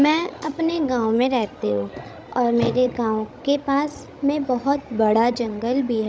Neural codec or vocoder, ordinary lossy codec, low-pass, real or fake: codec, 16 kHz, 16 kbps, FreqCodec, larger model; none; none; fake